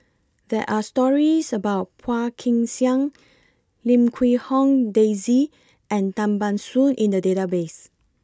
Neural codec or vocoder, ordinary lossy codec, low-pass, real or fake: none; none; none; real